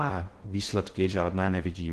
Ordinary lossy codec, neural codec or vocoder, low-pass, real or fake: Opus, 16 kbps; codec, 16 kHz in and 24 kHz out, 0.6 kbps, FocalCodec, streaming, 2048 codes; 10.8 kHz; fake